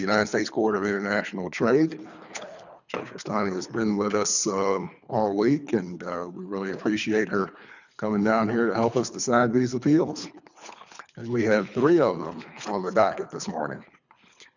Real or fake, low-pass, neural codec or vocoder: fake; 7.2 kHz; codec, 24 kHz, 3 kbps, HILCodec